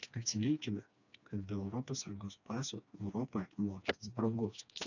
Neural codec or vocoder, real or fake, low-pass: codec, 16 kHz, 2 kbps, FreqCodec, smaller model; fake; 7.2 kHz